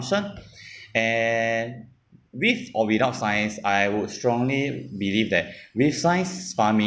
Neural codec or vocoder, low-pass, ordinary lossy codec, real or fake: none; none; none; real